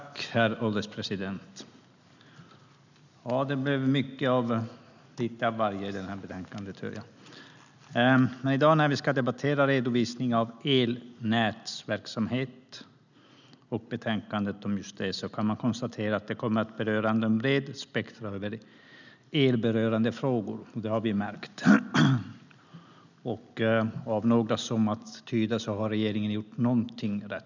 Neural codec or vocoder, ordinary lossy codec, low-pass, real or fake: none; none; 7.2 kHz; real